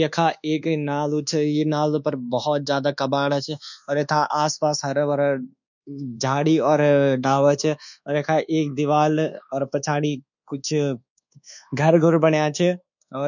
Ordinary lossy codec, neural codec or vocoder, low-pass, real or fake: none; codec, 24 kHz, 1.2 kbps, DualCodec; 7.2 kHz; fake